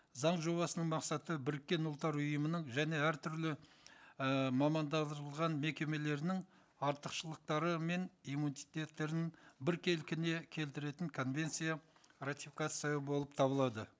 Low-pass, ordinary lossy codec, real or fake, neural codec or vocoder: none; none; real; none